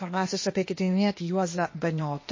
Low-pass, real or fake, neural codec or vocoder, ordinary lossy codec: 7.2 kHz; fake; codec, 16 kHz, 0.8 kbps, ZipCodec; MP3, 32 kbps